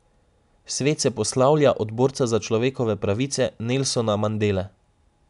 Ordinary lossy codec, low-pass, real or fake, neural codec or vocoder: none; 10.8 kHz; real; none